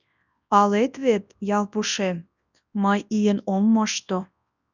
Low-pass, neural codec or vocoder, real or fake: 7.2 kHz; codec, 24 kHz, 0.9 kbps, WavTokenizer, large speech release; fake